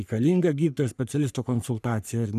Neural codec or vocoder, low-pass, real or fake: codec, 44.1 kHz, 3.4 kbps, Pupu-Codec; 14.4 kHz; fake